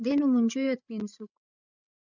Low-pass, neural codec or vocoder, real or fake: 7.2 kHz; codec, 16 kHz, 8 kbps, FreqCodec, larger model; fake